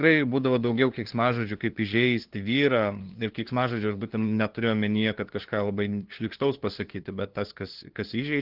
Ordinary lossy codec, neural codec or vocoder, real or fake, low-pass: Opus, 16 kbps; codec, 16 kHz, 2 kbps, FunCodec, trained on LibriTTS, 25 frames a second; fake; 5.4 kHz